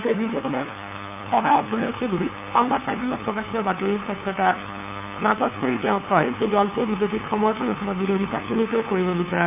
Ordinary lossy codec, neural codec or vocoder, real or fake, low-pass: none; codec, 16 kHz, 2 kbps, FunCodec, trained on LibriTTS, 25 frames a second; fake; 3.6 kHz